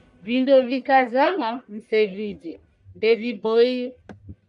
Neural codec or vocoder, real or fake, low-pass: codec, 44.1 kHz, 1.7 kbps, Pupu-Codec; fake; 10.8 kHz